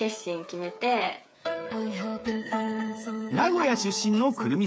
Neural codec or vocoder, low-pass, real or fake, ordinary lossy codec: codec, 16 kHz, 8 kbps, FreqCodec, smaller model; none; fake; none